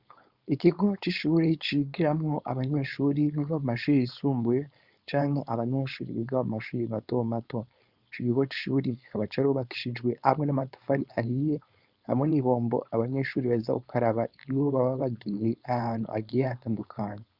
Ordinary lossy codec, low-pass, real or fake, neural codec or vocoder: Opus, 64 kbps; 5.4 kHz; fake; codec, 16 kHz, 4.8 kbps, FACodec